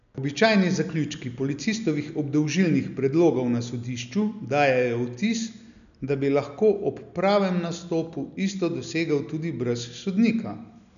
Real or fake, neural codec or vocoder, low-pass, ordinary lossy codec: real; none; 7.2 kHz; none